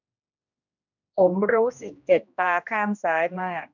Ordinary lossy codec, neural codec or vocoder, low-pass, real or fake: none; codec, 16 kHz, 1 kbps, X-Codec, HuBERT features, trained on general audio; 7.2 kHz; fake